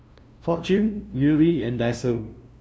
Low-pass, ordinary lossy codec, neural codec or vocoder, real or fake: none; none; codec, 16 kHz, 0.5 kbps, FunCodec, trained on LibriTTS, 25 frames a second; fake